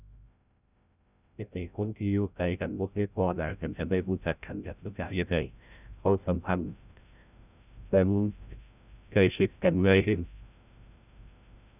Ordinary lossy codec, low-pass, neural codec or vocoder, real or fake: none; 3.6 kHz; codec, 16 kHz, 0.5 kbps, FreqCodec, larger model; fake